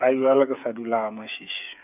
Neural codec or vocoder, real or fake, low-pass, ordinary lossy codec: codec, 16 kHz, 8 kbps, FreqCodec, smaller model; fake; 3.6 kHz; none